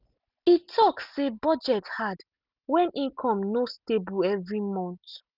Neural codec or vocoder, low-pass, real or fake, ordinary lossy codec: none; 5.4 kHz; real; none